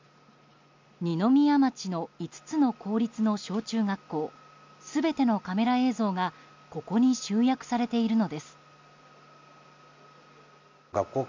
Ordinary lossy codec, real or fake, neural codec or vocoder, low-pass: MP3, 64 kbps; real; none; 7.2 kHz